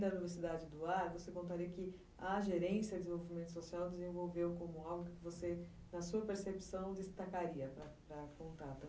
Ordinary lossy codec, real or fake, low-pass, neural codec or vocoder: none; real; none; none